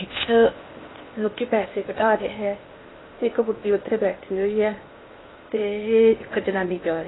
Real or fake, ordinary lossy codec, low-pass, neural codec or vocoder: fake; AAC, 16 kbps; 7.2 kHz; codec, 16 kHz in and 24 kHz out, 0.8 kbps, FocalCodec, streaming, 65536 codes